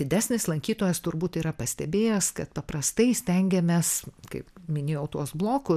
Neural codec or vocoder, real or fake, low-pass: none; real; 14.4 kHz